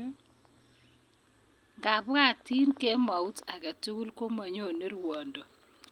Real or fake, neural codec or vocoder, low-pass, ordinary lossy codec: real; none; 19.8 kHz; Opus, 32 kbps